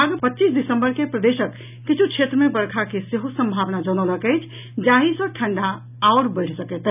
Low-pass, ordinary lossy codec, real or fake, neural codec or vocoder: 3.6 kHz; none; real; none